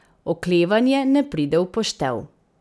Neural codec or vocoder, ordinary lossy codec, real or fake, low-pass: none; none; real; none